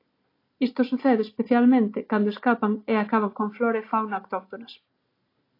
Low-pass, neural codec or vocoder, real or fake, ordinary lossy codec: 5.4 kHz; none; real; AAC, 32 kbps